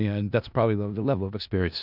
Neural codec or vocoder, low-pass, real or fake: codec, 16 kHz in and 24 kHz out, 0.4 kbps, LongCat-Audio-Codec, four codebook decoder; 5.4 kHz; fake